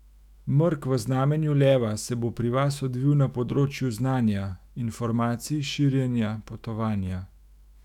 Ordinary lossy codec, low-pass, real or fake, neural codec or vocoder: none; 19.8 kHz; fake; autoencoder, 48 kHz, 128 numbers a frame, DAC-VAE, trained on Japanese speech